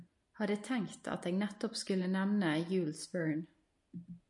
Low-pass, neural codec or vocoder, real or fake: 10.8 kHz; none; real